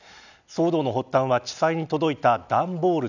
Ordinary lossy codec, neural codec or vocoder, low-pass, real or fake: none; none; 7.2 kHz; real